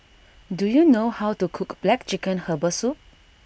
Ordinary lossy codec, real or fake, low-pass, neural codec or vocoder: none; real; none; none